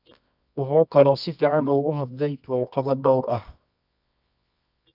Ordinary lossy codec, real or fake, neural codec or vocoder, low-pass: none; fake; codec, 24 kHz, 0.9 kbps, WavTokenizer, medium music audio release; 5.4 kHz